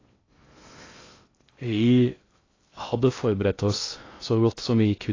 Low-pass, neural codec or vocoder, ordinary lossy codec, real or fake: 7.2 kHz; codec, 16 kHz in and 24 kHz out, 0.6 kbps, FocalCodec, streaming, 2048 codes; AAC, 32 kbps; fake